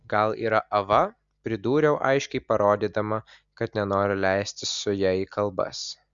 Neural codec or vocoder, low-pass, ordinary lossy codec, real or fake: none; 7.2 kHz; Opus, 64 kbps; real